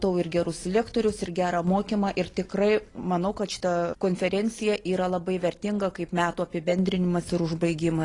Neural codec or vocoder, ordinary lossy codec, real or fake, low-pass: none; AAC, 32 kbps; real; 10.8 kHz